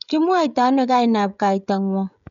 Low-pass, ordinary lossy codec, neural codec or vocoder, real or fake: 7.2 kHz; none; codec, 16 kHz, 16 kbps, FreqCodec, smaller model; fake